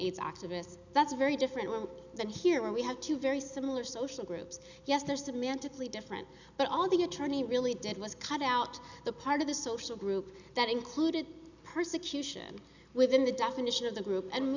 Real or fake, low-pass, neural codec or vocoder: real; 7.2 kHz; none